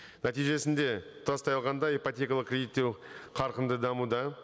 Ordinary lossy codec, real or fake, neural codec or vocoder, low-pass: none; real; none; none